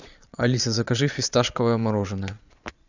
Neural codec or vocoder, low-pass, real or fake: vocoder, 22.05 kHz, 80 mel bands, Vocos; 7.2 kHz; fake